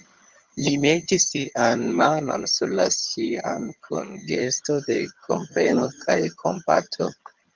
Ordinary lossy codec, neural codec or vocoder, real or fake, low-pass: Opus, 32 kbps; vocoder, 22.05 kHz, 80 mel bands, HiFi-GAN; fake; 7.2 kHz